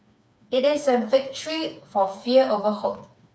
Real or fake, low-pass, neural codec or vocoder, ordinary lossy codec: fake; none; codec, 16 kHz, 4 kbps, FreqCodec, smaller model; none